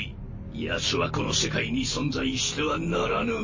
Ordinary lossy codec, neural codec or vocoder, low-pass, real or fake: MP3, 32 kbps; none; 7.2 kHz; real